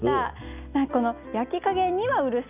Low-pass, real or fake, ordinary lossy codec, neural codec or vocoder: 3.6 kHz; real; none; none